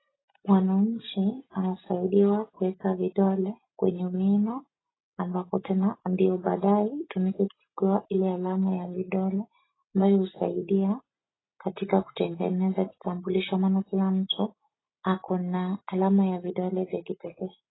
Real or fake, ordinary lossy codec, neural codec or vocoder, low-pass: real; AAC, 16 kbps; none; 7.2 kHz